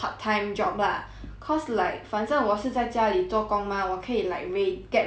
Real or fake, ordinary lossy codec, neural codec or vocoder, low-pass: real; none; none; none